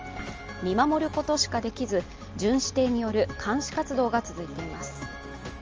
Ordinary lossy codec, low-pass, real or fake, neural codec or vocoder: Opus, 24 kbps; 7.2 kHz; real; none